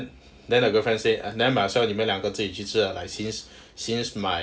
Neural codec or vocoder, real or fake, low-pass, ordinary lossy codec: none; real; none; none